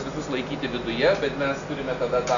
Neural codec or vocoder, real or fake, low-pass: none; real; 7.2 kHz